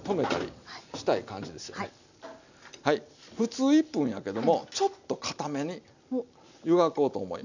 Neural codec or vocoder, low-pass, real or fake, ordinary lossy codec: none; 7.2 kHz; real; none